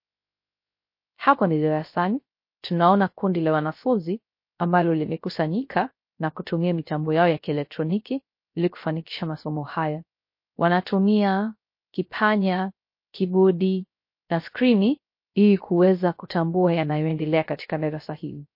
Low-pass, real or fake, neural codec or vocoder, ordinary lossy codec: 5.4 kHz; fake; codec, 16 kHz, 0.3 kbps, FocalCodec; MP3, 32 kbps